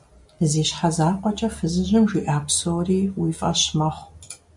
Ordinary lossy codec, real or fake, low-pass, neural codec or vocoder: MP3, 64 kbps; real; 10.8 kHz; none